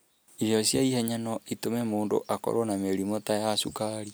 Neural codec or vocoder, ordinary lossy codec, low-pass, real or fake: none; none; none; real